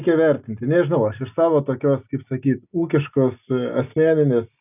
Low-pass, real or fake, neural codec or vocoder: 3.6 kHz; real; none